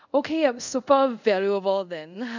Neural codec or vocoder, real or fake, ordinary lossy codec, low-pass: codec, 24 kHz, 0.5 kbps, DualCodec; fake; none; 7.2 kHz